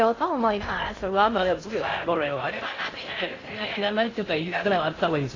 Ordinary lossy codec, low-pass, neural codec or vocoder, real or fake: AAC, 32 kbps; 7.2 kHz; codec, 16 kHz in and 24 kHz out, 0.6 kbps, FocalCodec, streaming, 2048 codes; fake